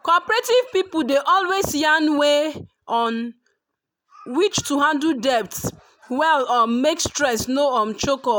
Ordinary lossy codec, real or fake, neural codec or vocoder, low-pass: none; real; none; none